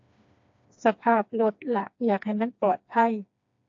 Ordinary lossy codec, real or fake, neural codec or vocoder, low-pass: AAC, 48 kbps; fake; codec, 16 kHz, 2 kbps, FreqCodec, smaller model; 7.2 kHz